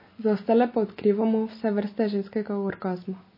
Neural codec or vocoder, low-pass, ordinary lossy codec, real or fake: none; 5.4 kHz; MP3, 24 kbps; real